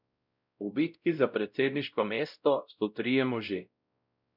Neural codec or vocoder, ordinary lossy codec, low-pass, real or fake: codec, 16 kHz, 0.5 kbps, X-Codec, WavLM features, trained on Multilingual LibriSpeech; none; 5.4 kHz; fake